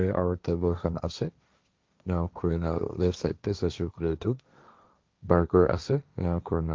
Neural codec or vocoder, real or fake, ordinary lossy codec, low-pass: codec, 16 kHz, 1.1 kbps, Voila-Tokenizer; fake; Opus, 32 kbps; 7.2 kHz